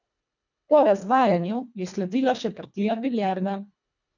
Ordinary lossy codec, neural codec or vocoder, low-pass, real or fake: none; codec, 24 kHz, 1.5 kbps, HILCodec; 7.2 kHz; fake